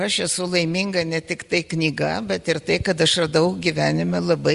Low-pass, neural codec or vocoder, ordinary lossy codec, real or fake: 10.8 kHz; none; Opus, 64 kbps; real